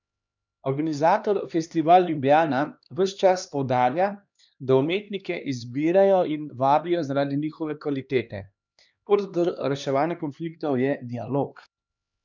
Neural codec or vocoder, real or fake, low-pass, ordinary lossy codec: codec, 16 kHz, 2 kbps, X-Codec, HuBERT features, trained on LibriSpeech; fake; 7.2 kHz; none